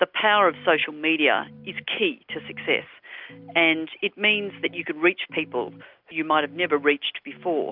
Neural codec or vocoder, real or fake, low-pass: none; real; 5.4 kHz